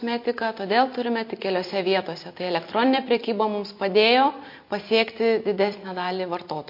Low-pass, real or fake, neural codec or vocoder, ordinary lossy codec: 5.4 kHz; fake; vocoder, 44.1 kHz, 128 mel bands every 256 samples, BigVGAN v2; MP3, 32 kbps